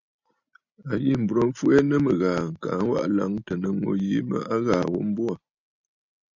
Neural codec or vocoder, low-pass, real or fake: none; 7.2 kHz; real